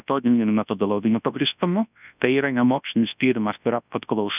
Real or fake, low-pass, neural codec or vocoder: fake; 3.6 kHz; codec, 24 kHz, 0.9 kbps, WavTokenizer, large speech release